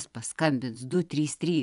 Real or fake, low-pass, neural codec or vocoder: fake; 10.8 kHz; vocoder, 24 kHz, 100 mel bands, Vocos